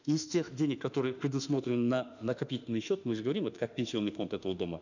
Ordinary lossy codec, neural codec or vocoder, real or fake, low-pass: none; autoencoder, 48 kHz, 32 numbers a frame, DAC-VAE, trained on Japanese speech; fake; 7.2 kHz